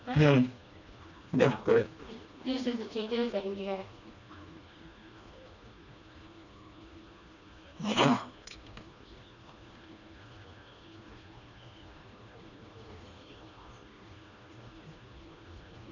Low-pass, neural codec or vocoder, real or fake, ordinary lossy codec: 7.2 kHz; codec, 16 kHz, 2 kbps, FreqCodec, smaller model; fake; none